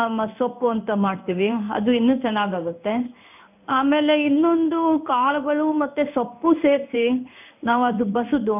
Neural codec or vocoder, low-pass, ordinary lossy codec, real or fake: codec, 16 kHz in and 24 kHz out, 1 kbps, XY-Tokenizer; 3.6 kHz; none; fake